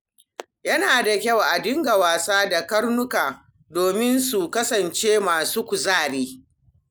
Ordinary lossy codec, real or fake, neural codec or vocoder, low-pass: none; real; none; none